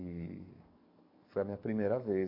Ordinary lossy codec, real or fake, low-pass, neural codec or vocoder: MP3, 32 kbps; fake; 5.4 kHz; codec, 44.1 kHz, 7.8 kbps, DAC